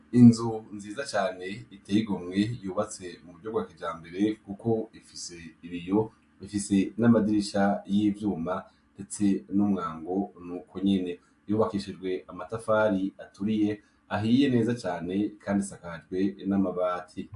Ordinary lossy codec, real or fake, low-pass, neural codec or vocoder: AAC, 64 kbps; real; 10.8 kHz; none